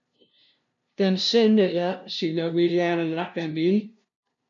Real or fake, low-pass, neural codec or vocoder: fake; 7.2 kHz; codec, 16 kHz, 0.5 kbps, FunCodec, trained on LibriTTS, 25 frames a second